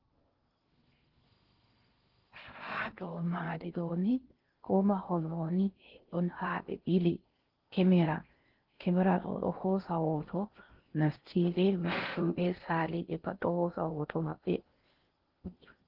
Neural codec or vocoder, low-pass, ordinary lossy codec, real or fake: codec, 16 kHz in and 24 kHz out, 0.6 kbps, FocalCodec, streaming, 2048 codes; 5.4 kHz; Opus, 16 kbps; fake